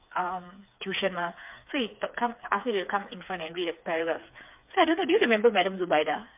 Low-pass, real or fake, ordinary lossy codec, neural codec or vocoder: 3.6 kHz; fake; MP3, 32 kbps; codec, 16 kHz, 4 kbps, FreqCodec, smaller model